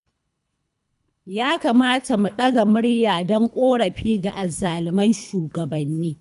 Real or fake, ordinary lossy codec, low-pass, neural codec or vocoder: fake; none; 10.8 kHz; codec, 24 kHz, 3 kbps, HILCodec